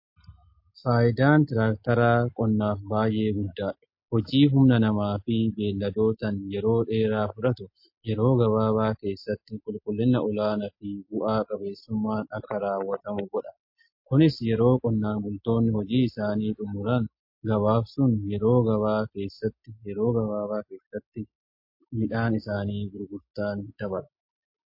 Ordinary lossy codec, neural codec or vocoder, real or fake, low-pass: MP3, 32 kbps; none; real; 5.4 kHz